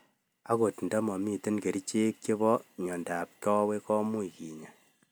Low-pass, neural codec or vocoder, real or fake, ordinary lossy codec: none; none; real; none